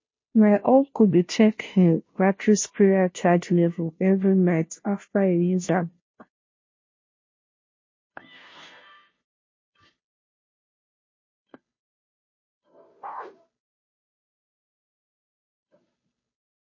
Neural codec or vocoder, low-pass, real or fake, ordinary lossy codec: codec, 16 kHz, 0.5 kbps, FunCodec, trained on Chinese and English, 25 frames a second; 7.2 kHz; fake; MP3, 32 kbps